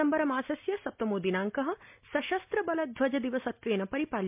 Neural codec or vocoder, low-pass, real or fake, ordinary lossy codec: none; 3.6 kHz; real; MP3, 32 kbps